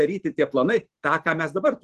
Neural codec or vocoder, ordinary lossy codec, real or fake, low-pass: vocoder, 44.1 kHz, 128 mel bands every 512 samples, BigVGAN v2; Opus, 16 kbps; fake; 14.4 kHz